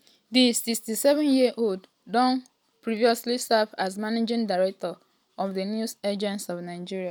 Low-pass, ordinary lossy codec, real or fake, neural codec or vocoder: none; none; real; none